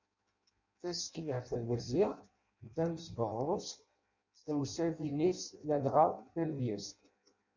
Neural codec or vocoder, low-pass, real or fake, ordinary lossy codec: codec, 16 kHz in and 24 kHz out, 0.6 kbps, FireRedTTS-2 codec; 7.2 kHz; fake; MP3, 64 kbps